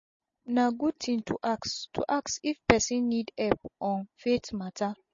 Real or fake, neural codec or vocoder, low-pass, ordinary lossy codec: real; none; 7.2 kHz; MP3, 32 kbps